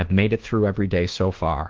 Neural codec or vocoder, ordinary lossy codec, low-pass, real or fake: codec, 24 kHz, 1.2 kbps, DualCodec; Opus, 16 kbps; 7.2 kHz; fake